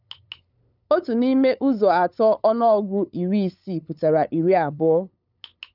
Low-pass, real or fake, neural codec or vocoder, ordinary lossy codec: 5.4 kHz; fake; codec, 16 kHz, 8 kbps, FunCodec, trained on LibriTTS, 25 frames a second; none